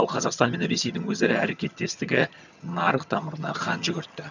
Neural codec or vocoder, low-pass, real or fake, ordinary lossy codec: vocoder, 22.05 kHz, 80 mel bands, HiFi-GAN; 7.2 kHz; fake; none